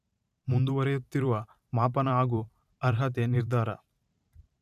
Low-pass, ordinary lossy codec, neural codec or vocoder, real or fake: 14.4 kHz; none; vocoder, 44.1 kHz, 128 mel bands every 512 samples, BigVGAN v2; fake